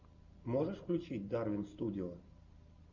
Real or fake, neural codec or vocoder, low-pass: real; none; 7.2 kHz